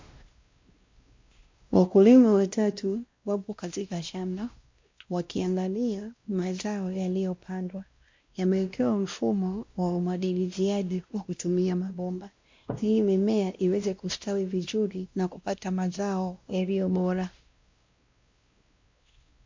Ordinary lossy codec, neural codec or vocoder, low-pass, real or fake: MP3, 48 kbps; codec, 16 kHz, 1 kbps, X-Codec, WavLM features, trained on Multilingual LibriSpeech; 7.2 kHz; fake